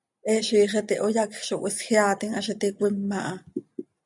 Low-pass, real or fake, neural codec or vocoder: 10.8 kHz; real; none